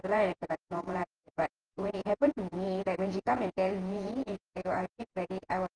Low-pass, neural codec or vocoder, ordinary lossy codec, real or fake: 9.9 kHz; vocoder, 48 kHz, 128 mel bands, Vocos; Opus, 16 kbps; fake